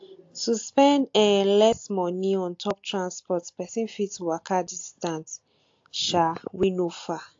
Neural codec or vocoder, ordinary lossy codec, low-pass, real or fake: none; AAC, 48 kbps; 7.2 kHz; real